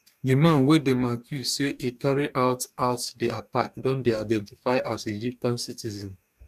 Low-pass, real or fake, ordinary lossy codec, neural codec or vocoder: 14.4 kHz; fake; none; codec, 44.1 kHz, 2.6 kbps, DAC